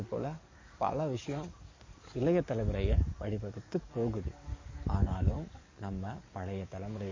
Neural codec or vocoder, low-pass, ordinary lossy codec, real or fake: codec, 44.1 kHz, 7.8 kbps, DAC; 7.2 kHz; MP3, 32 kbps; fake